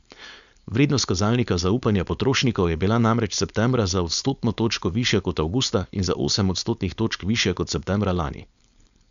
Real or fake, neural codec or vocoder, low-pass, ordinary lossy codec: fake; codec, 16 kHz, 4.8 kbps, FACodec; 7.2 kHz; none